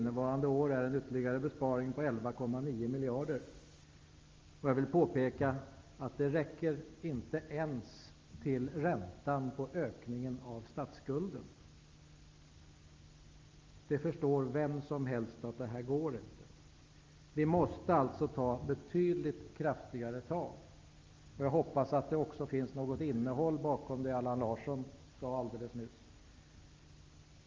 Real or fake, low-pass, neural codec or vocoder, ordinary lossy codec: real; 7.2 kHz; none; Opus, 32 kbps